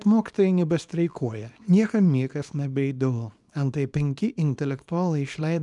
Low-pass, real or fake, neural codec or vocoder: 10.8 kHz; fake; codec, 24 kHz, 0.9 kbps, WavTokenizer, small release